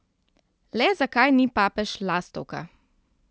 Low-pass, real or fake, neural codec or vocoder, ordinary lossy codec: none; real; none; none